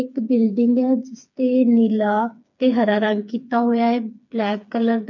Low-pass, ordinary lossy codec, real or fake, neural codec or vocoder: 7.2 kHz; none; fake; codec, 16 kHz, 4 kbps, FreqCodec, smaller model